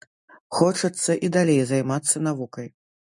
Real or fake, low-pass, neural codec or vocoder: real; 10.8 kHz; none